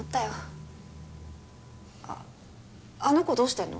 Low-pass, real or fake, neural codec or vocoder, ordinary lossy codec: none; real; none; none